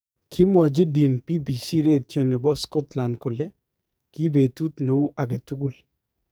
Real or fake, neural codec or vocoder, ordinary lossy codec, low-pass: fake; codec, 44.1 kHz, 2.6 kbps, SNAC; none; none